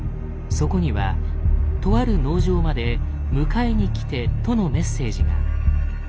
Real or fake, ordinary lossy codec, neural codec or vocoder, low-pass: real; none; none; none